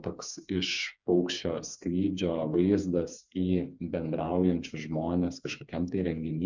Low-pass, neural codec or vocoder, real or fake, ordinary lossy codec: 7.2 kHz; codec, 16 kHz, 4 kbps, FreqCodec, smaller model; fake; MP3, 64 kbps